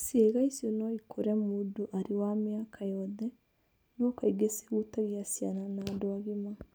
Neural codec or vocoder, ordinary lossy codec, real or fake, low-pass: none; none; real; none